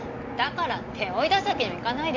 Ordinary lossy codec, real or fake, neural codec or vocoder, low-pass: AAC, 48 kbps; real; none; 7.2 kHz